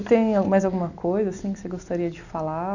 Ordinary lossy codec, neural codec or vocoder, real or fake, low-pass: none; none; real; 7.2 kHz